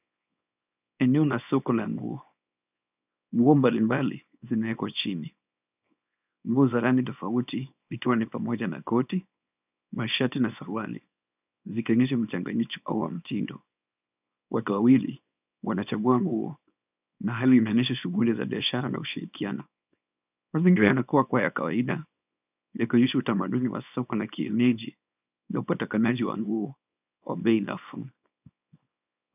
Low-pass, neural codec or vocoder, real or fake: 3.6 kHz; codec, 24 kHz, 0.9 kbps, WavTokenizer, small release; fake